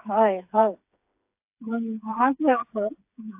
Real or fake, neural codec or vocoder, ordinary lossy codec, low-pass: fake; codec, 16 kHz, 4 kbps, FreqCodec, smaller model; AAC, 32 kbps; 3.6 kHz